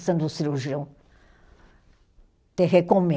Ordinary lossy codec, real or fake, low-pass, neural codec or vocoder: none; real; none; none